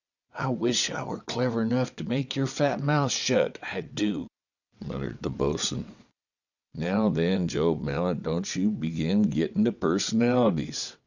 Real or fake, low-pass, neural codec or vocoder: fake; 7.2 kHz; vocoder, 22.05 kHz, 80 mel bands, WaveNeXt